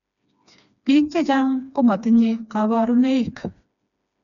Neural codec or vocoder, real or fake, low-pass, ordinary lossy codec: codec, 16 kHz, 2 kbps, FreqCodec, smaller model; fake; 7.2 kHz; none